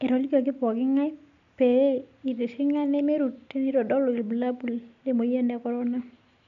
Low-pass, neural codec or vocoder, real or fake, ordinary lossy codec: 7.2 kHz; none; real; none